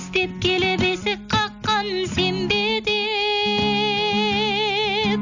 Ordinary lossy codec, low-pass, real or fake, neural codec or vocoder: none; 7.2 kHz; real; none